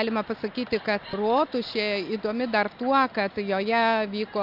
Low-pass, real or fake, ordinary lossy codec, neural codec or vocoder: 5.4 kHz; real; AAC, 48 kbps; none